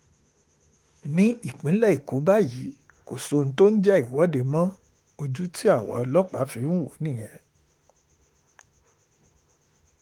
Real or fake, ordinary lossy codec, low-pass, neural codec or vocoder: fake; Opus, 24 kbps; 19.8 kHz; autoencoder, 48 kHz, 32 numbers a frame, DAC-VAE, trained on Japanese speech